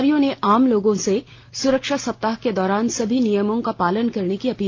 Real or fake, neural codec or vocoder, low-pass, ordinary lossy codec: real; none; 7.2 kHz; Opus, 24 kbps